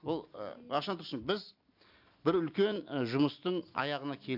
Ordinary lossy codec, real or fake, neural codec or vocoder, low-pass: AAC, 48 kbps; real; none; 5.4 kHz